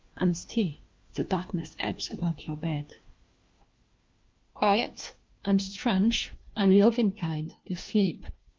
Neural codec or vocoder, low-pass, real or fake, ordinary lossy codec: codec, 16 kHz, 1 kbps, FunCodec, trained on LibriTTS, 50 frames a second; 7.2 kHz; fake; Opus, 24 kbps